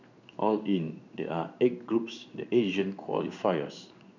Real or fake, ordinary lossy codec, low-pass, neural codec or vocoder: fake; none; 7.2 kHz; codec, 16 kHz in and 24 kHz out, 1 kbps, XY-Tokenizer